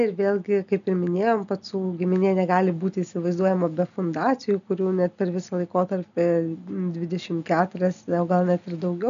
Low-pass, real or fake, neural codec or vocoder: 7.2 kHz; real; none